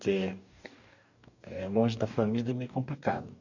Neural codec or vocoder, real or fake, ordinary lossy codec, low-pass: codec, 44.1 kHz, 2.6 kbps, DAC; fake; none; 7.2 kHz